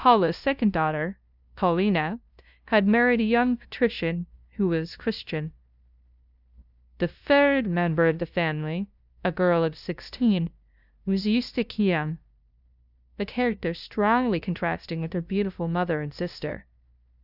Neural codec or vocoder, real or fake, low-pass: codec, 16 kHz, 0.5 kbps, FunCodec, trained on LibriTTS, 25 frames a second; fake; 5.4 kHz